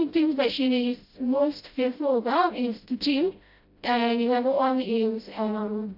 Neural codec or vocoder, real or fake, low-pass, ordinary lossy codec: codec, 16 kHz, 0.5 kbps, FreqCodec, smaller model; fake; 5.4 kHz; AAC, 48 kbps